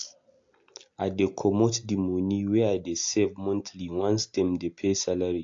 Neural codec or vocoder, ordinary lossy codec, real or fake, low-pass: none; AAC, 48 kbps; real; 7.2 kHz